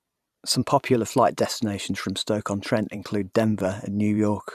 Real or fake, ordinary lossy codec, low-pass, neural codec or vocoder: fake; none; 14.4 kHz; vocoder, 44.1 kHz, 128 mel bands every 512 samples, BigVGAN v2